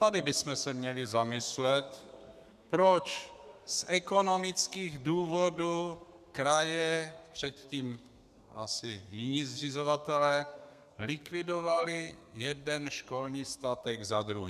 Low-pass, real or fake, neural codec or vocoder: 14.4 kHz; fake; codec, 32 kHz, 1.9 kbps, SNAC